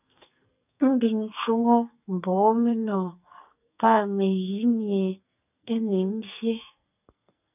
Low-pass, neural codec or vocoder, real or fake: 3.6 kHz; codec, 44.1 kHz, 2.6 kbps, SNAC; fake